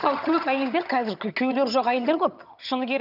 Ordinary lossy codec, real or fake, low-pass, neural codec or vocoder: none; fake; 5.4 kHz; vocoder, 22.05 kHz, 80 mel bands, HiFi-GAN